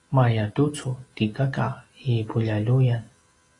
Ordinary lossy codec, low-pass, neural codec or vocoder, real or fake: AAC, 32 kbps; 10.8 kHz; none; real